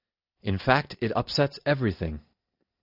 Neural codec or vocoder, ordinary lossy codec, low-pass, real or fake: none; Opus, 64 kbps; 5.4 kHz; real